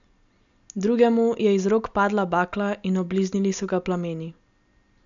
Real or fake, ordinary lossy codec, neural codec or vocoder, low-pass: real; none; none; 7.2 kHz